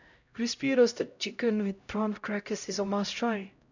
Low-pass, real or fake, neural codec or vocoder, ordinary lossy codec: 7.2 kHz; fake; codec, 16 kHz, 0.5 kbps, X-Codec, HuBERT features, trained on LibriSpeech; none